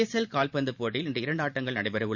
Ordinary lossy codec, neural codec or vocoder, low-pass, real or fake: none; vocoder, 44.1 kHz, 128 mel bands every 512 samples, BigVGAN v2; 7.2 kHz; fake